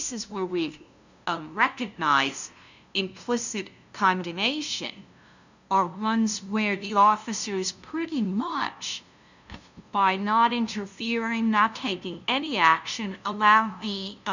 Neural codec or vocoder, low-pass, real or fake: codec, 16 kHz, 0.5 kbps, FunCodec, trained on LibriTTS, 25 frames a second; 7.2 kHz; fake